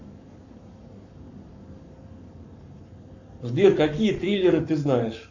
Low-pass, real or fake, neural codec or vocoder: 7.2 kHz; fake; codec, 44.1 kHz, 7.8 kbps, Pupu-Codec